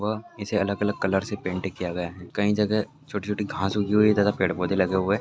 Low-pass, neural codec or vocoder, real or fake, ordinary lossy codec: none; none; real; none